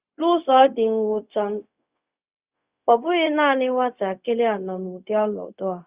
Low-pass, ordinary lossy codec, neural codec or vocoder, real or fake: 3.6 kHz; Opus, 64 kbps; codec, 16 kHz, 0.4 kbps, LongCat-Audio-Codec; fake